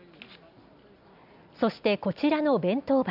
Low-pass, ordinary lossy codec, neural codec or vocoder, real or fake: 5.4 kHz; none; none; real